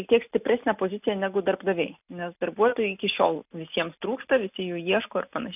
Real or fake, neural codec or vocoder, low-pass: real; none; 3.6 kHz